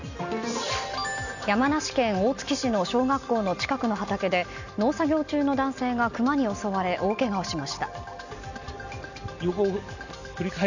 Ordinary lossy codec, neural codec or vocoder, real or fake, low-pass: none; none; real; 7.2 kHz